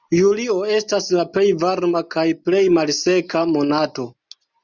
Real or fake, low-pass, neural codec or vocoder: real; 7.2 kHz; none